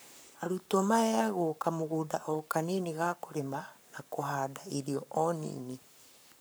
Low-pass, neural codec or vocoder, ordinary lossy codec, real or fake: none; codec, 44.1 kHz, 7.8 kbps, Pupu-Codec; none; fake